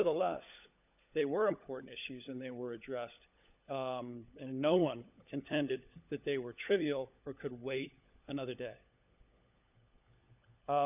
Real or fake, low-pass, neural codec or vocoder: fake; 3.6 kHz; codec, 16 kHz, 16 kbps, FunCodec, trained on LibriTTS, 50 frames a second